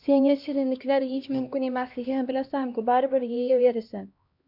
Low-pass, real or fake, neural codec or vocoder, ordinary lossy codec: 5.4 kHz; fake; codec, 16 kHz, 1 kbps, X-Codec, HuBERT features, trained on LibriSpeech; MP3, 48 kbps